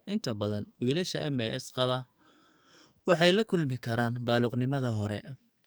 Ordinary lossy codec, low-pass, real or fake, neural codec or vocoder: none; none; fake; codec, 44.1 kHz, 2.6 kbps, SNAC